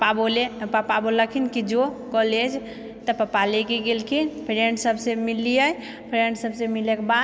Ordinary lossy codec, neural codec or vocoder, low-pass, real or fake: none; none; none; real